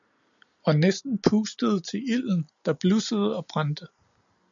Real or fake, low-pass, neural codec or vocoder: real; 7.2 kHz; none